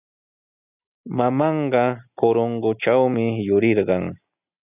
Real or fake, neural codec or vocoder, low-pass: real; none; 3.6 kHz